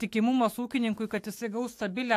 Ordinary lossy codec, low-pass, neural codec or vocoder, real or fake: AAC, 64 kbps; 14.4 kHz; autoencoder, 48 kHz, 128 numbers a frame, DAC-VAE, trained on Japanese speech; fake